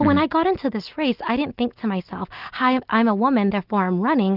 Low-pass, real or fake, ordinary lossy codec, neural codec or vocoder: 5.4 kHz; real; Opus, 32 kbps; none